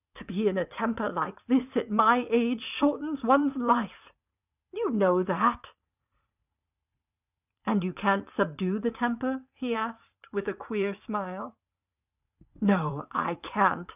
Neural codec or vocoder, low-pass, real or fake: vocoder, 44.1 kHz, 80 mel bands, Vocos; 3.6 kHz; fake